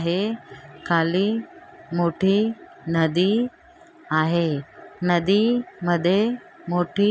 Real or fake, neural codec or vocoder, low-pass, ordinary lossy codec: real; none; none; none